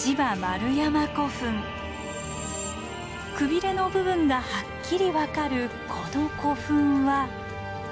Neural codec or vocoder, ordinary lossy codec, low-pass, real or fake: none; none; none; real